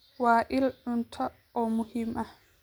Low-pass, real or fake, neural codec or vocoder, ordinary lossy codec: none; real; none; none